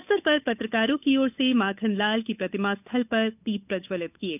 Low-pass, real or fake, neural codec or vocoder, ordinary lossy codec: 3.6 kHz; fake; codec, 16 kHz, 8 kbps, FunCodec, trained on Chinese and English, 25 frames a second; none